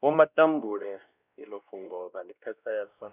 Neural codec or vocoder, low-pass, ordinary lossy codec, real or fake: codec, 16 kHz, 1 kbps, X-Codec, WavLM features, trained on Multilingual LibriSpeech; 3.6 kHz; Opus, 64 kbps; fake